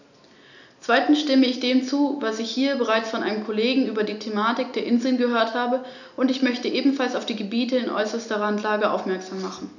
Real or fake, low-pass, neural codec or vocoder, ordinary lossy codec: real; 7.2 kHz; none; none